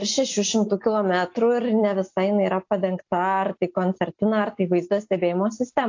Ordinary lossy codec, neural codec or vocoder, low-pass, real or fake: AAC, 48 kbps; none; 7.2 kHz; real